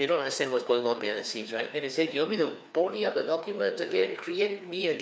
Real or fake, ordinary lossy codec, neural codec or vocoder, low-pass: fake; none; codec, 16 kHz, 2 kbps, FreqCodec, larger model; none